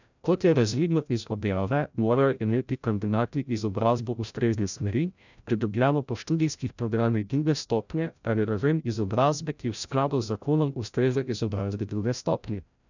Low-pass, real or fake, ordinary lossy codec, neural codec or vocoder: 7.2 kHz; fake; none; codec, 16 kHz, 0.5 kbps, FreqCodec, larger model